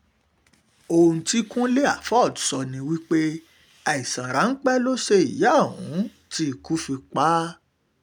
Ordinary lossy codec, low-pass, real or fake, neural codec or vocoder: none; none; real; none